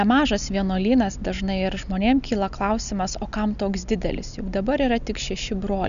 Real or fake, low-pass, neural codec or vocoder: real; 7.2 kHz; none